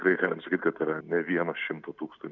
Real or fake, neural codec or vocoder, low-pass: real; none; 7.2 kHz